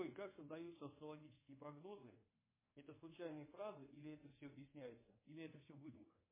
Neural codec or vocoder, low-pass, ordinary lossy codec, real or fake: codec, 24 kHz, 1.2 kbps, DualCodec; 3.6 kHz; MP3, 16 kbps; fake